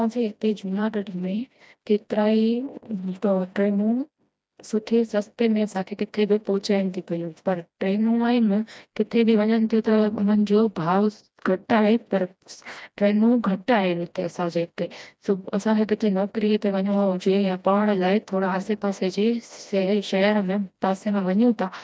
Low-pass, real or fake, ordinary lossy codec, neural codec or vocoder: none; fake; none; codec, 16 kHz, 1 kbps, FreqCodec, smaller model